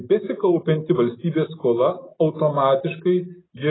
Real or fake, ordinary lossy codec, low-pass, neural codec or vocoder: real; AAC, 16 kbps; 7.2 kHz; none